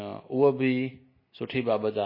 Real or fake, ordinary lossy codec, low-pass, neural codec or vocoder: real; MP3, 24 kbps; 5.4 kHz; none